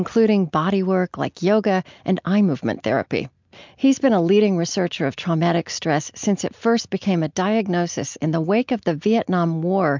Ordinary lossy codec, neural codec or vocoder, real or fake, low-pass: MP3, 64 kbps; none; real; 7.2 kHz